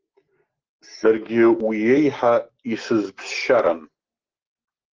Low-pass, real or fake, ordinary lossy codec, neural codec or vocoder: 7.2 kHz; real; Opus, 16 kbps; none